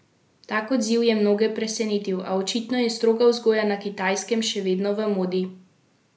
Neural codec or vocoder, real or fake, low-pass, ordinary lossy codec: none; real; none; none